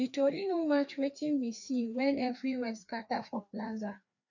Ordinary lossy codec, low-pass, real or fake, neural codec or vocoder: none; 7.2 kHz; fake; codec, 16 kHz, 2 kbps, FreqCodec, larger model